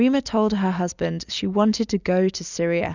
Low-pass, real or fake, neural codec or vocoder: 7.2 kHz; real; none